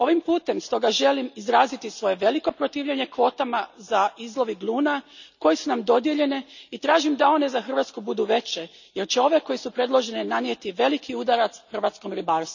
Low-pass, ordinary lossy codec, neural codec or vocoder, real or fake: 7.2 kHz; none; none; real